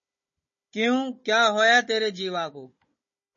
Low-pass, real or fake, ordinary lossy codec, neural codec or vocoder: 7.2 kHz; fake; MP3, 32 kbps; codec, 16 kHz, 16 kbps, FunCodec, trained on Chinese and English, 50 frames a second